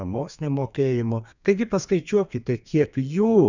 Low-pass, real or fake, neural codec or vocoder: 7.2 kHz; fake; codec, 32 kHz, 1.9 kbps, SNAC